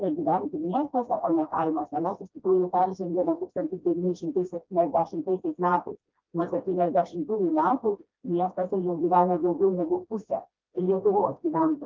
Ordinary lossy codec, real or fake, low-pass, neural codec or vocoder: Opus, 24 kbps; fake; 7.2 kHz; codec, 16 kHz, 1 kbps, FreqCodec, smaller model